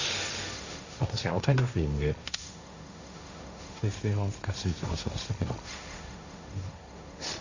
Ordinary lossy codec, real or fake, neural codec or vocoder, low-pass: Opus, 64 kbps; fake; codec, 16 kHz, 1.1 kbps, Voila-Tokenizer; 7.2 kHz